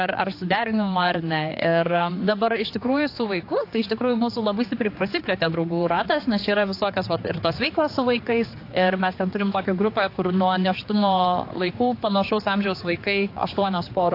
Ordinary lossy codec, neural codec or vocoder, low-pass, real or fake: AAC, 32 kbps; codec, 16 kHz, 4 kbps, X-Codec, HuBERT features, trained on general audio; 5.4 kHz; fake